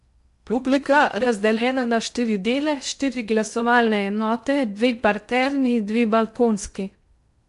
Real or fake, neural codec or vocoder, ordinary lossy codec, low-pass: fake; codec, 16 kHz in and 24 kHz out, 0.6 kbps, FocalCodec, streaming, 2048 codes; MP3, 64 kbps; 10.8 kHz